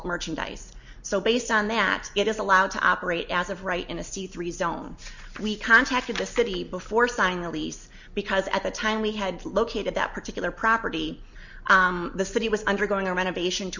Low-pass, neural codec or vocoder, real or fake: 7.2 kHz; none; real